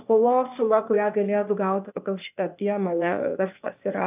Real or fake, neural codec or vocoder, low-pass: fake; codec, 16 kHz, 0.8 kbps, ZipCodec; 3.6 kHz